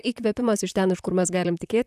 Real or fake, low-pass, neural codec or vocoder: fake; 14.4 kHz; vocoder, 44.1 kHz, 128 mel bands, Pupu-Vocoder